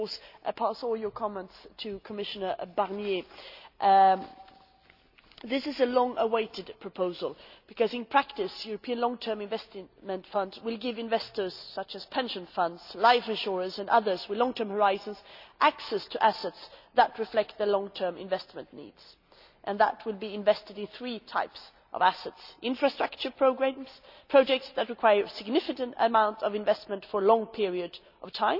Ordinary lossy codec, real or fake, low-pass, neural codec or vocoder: none; real; 5.4 kHz; none